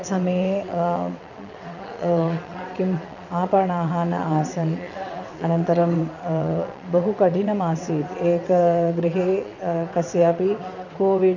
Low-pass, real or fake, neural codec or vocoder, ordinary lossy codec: 7.2 kHz; fake; vocoder, 22.05 kHz, 80 mel bands, WaveNeXt; none